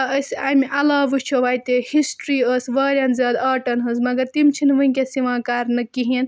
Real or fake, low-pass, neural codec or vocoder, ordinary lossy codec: real; none; none; none